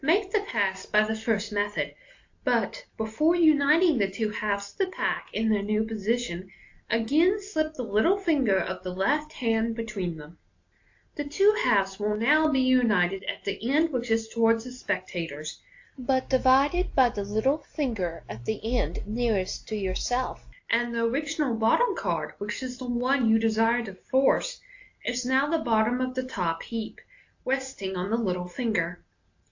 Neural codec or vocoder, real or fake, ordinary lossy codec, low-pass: none; real; AAC, 48 kbps; 7.2 kHz